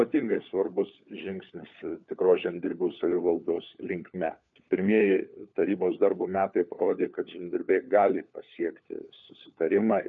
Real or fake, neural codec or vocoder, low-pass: fake; codec, 16 kHz, 4 kbps, FunCodec, trained on LibriTTS, 50 frames a second; 7.2 kHz